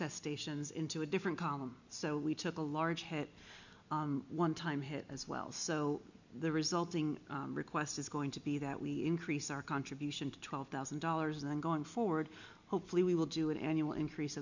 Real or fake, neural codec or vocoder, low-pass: real; none; 7.2 kHz